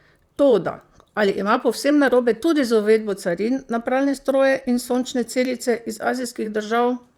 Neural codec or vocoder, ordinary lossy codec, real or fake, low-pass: vocoder, 44.1 kHz, 128 mel bands, Pupu-Vocoder; Opus, 64 kbps; fake; 19.8 kHz